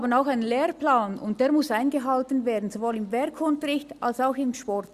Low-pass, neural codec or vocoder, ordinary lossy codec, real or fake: 14.4 kHz; none; Opus, 64 kbps; real